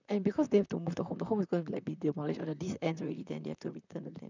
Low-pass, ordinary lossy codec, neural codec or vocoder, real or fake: 7.2 kHz; none; vocoder, 44.1 kHz, 128 mel bands, Pupu-Vocoder; fake